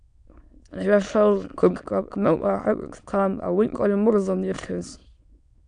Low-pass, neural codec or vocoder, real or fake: 9.9 kHz; autoencoder, 22.05 kHz, a latent of 192 numbers a frame, VITS, trained on many speakers; fake